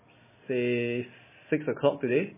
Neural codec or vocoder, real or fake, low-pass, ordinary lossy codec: none; real; 3.6 kHz; MP3, 16 kbps